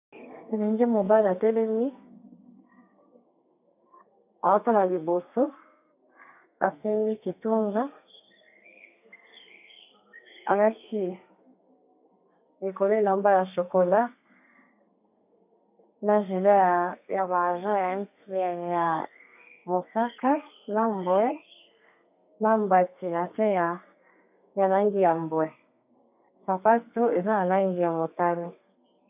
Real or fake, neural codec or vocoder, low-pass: fake; codec, 32 kHz, 1.9 kbps, SNAC; 3.6 kHz